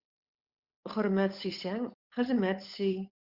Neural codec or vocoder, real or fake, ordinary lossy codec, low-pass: codec, 16 kHz, 8 kbps, FunCodec, trained on Chinese and English, 25 frames a second; fake; Opus, 64 kbps; 5.4 kHz